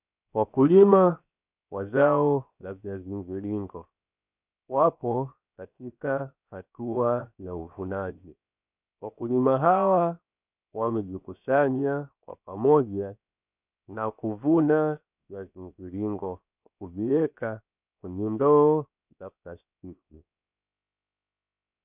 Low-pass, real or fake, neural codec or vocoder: 3.6 kHz; fake; codec, 16 kHz, 0.7 kbps, FocalCodec